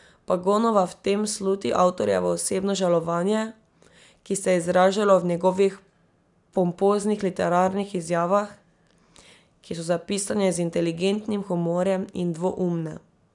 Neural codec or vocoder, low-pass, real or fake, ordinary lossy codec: none; 10.8 kHz; real; none